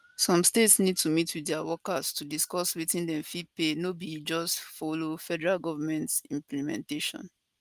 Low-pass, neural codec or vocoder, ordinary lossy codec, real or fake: 14.4 kHz; none; Opus, 32 kbps; real